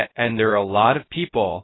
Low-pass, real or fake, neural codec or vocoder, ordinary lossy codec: 7.2 kHz; fake; codec, 16 kHz, 0.3 kbps, FocalCodec; AAC, 16 kbps